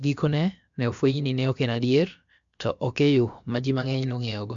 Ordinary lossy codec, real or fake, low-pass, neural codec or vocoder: MP3, 64 kbps; fake; 7.2 kHz; codec, 16 kHz, about 1 kbps, DyCAST, with the encoder's durations